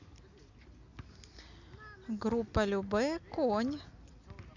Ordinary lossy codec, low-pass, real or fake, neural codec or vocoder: Opus, 64 kbps; 7.2 kHz; real; none